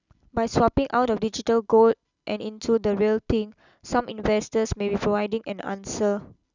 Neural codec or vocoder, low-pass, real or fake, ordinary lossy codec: none; 7.2 kHz; real; none